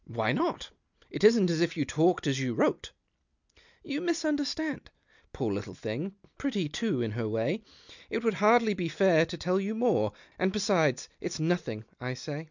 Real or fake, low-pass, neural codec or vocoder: real; 7.2 kHz; none